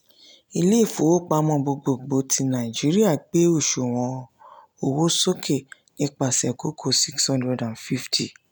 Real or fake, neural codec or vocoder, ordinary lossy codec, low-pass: real; none; none; none